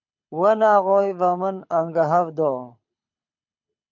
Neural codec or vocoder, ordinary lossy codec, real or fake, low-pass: codec, 24 kHz, 6 kbps, HILCodec; MP3, 48 kbps; fake; 7.2 kHz